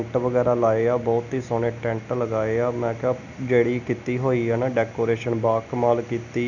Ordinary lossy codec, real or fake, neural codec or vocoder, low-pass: none; real; none; 7.2 kHz